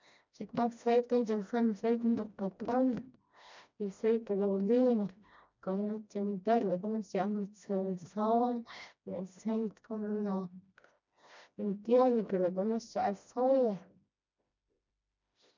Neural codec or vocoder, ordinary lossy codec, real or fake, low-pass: codec, 16 kHz, 1 kbps, FreqCodec, smaller model; MP3, 64 kbps; fake; 7.2 kHz